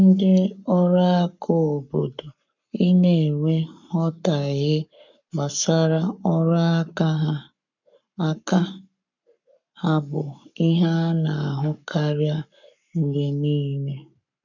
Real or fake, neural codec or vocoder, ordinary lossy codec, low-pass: fake; codec, 44.1 kHz, 7.8 kbps, Pupu-Codec; none; 7.2 kHz